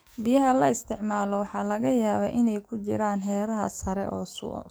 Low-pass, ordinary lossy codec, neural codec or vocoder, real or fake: none; none; codec, 44.1 kHz, 7.8 kbps, DAC; fake